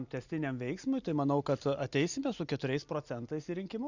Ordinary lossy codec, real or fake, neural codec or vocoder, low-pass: Opus, 64 kbps; real; none; 7.2 kHz